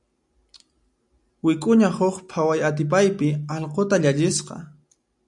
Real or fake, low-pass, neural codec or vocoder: real; 10.8 kHz; none